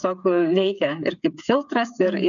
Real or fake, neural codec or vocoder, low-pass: fake; codec, 16 kHz, 8 kbps, FreqCodec, smaller model; 7.2 kHz